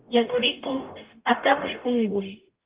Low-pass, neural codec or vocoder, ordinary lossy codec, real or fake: 3.6 kHz; codec, 44.1 kHz, 0.9 kbps, DAC; Opus, 24 kbps; fake